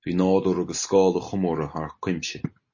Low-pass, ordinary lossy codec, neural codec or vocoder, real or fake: 7.2 kHz; MP3, 32 kbps; none; real